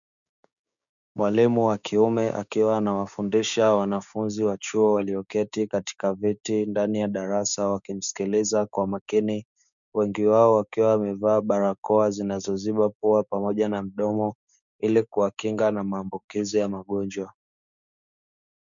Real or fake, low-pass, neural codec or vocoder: fake; 7.2 kHz; codec, 16 kHz, 6 kbps, DAC